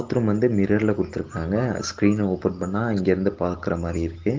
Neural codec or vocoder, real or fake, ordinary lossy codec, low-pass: none; real; Opus, 16 kbps; 7.2 kHz